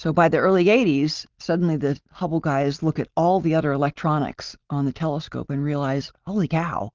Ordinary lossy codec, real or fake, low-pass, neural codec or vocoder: Opus, 32 kbps; real; 7.2 kHz; none